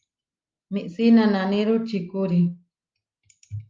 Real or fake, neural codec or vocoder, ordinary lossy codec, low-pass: real; none; Opus, 32 kbps; 7.2 kHz